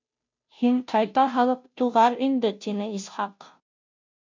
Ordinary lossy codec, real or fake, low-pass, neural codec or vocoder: MP3, 48 kbps; fake; 7.2 kHz; codec, 16 kHz, 0.5 kbps, FunCodec, trained on Chinese and English, 25 frames a second